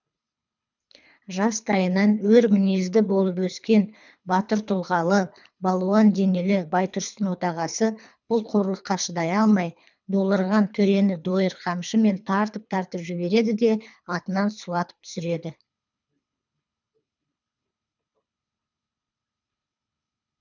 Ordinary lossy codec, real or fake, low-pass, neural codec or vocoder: none; fake; 7.2 kHz; codec, 24 kHz, 3 kbps, HILCodec